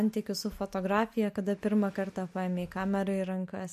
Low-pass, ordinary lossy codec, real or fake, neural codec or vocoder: 14.4 kHz; MP3, 64 kbps; real; none